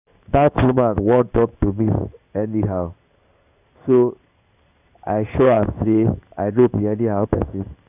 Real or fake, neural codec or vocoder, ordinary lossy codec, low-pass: fake; codec, 16 kHz in and 24 kHz out, 1 kbps, XY-Tokenizer; none; 3.6 kHz